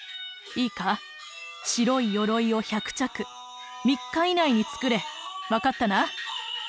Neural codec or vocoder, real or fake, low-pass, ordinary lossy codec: none; real; none; none